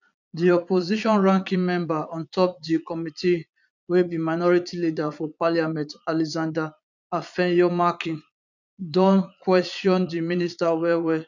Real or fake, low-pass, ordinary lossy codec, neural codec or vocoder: fake; 7.2 kHz; none; vocoder, 22.05 kHz, 80 mel bands, Vocos